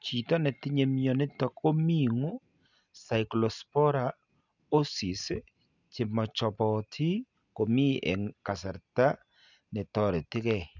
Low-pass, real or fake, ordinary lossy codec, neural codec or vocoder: 7.2 kHz; real; none; none